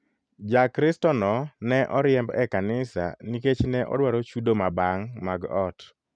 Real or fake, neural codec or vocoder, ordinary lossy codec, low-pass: real; none; none; 9.9 kHz